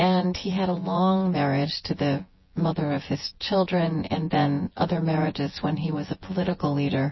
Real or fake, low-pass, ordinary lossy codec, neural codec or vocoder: fake; 7.2 kHz; MP3, 24 kbps; vocoder, 24 kHz, 100 mel bands, Vocos